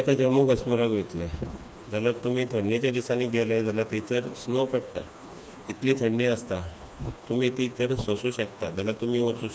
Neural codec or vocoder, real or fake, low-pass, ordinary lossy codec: codec, 16 kHz, 2 kbps, FreqCodec, smaller model; fake; none; none